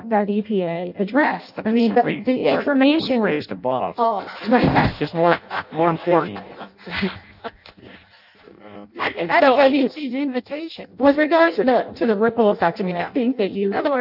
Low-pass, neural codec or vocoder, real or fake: 5.4 kHz; codec, 16 kHz in and 24 kHz out, 0.6 kbps, FireRedTTS-2 codec; fake